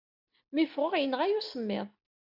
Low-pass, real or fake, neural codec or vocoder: 5.4 kHz; real; none